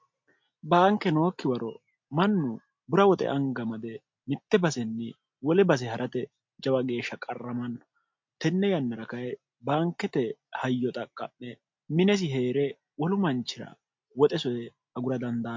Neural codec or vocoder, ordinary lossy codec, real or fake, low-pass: none; MP3, 48 kbps; real; 7.2 kHz